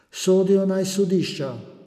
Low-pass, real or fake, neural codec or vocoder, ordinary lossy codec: 14.4 kHz; real; none; none